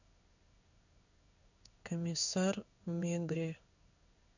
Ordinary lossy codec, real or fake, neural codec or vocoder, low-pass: none; fake; codec, 16 kHz in and 24 kHz out, 1 kbps, XY-Tokenizer; 7.2 kHz